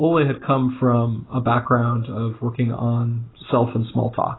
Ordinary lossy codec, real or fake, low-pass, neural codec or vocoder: AAC, 16 kbps; real; 7.2 kHz; none